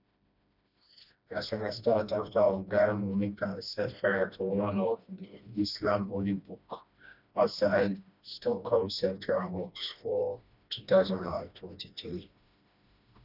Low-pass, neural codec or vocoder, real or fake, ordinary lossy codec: 5.4 kHz; codec, 16 kHz, 1 kbps, FreqCodec, smaller model; fake; none